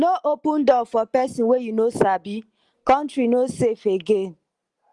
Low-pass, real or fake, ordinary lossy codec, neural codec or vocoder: 10.8 kHz; real; Opus, 24 kbps; none